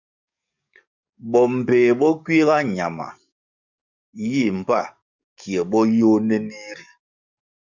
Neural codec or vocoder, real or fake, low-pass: codec, 44.1 kHz, 7.8 kbps, DAC; fake; 7.2 kHz